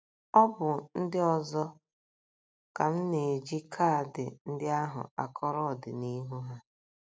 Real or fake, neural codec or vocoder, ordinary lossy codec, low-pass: real; none; none; none